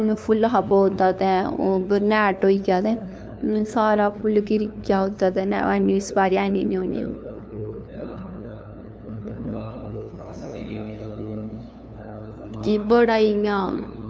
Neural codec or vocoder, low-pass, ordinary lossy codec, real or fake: codec, 16 kHz, 2 kbps, FunCodec, trained on LibriTTS, 25 frames a second; none; none; fake